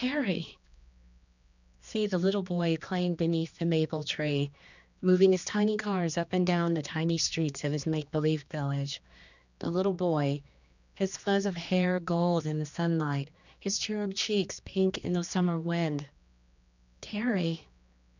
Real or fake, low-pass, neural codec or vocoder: fake; 7.2 kHz; codec, 16 kHz, 2 kbps, X-Codec, HuBERT features, trained on general audio